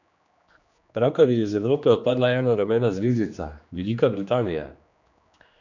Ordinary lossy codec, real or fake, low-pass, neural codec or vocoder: none; fake; 7.2 kHz; codec, 16 kHz, 2 kbps, X-Codec, HuBERT features, trained on general audio